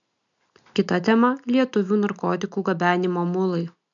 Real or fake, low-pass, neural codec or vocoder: real; 7.2 kHz; none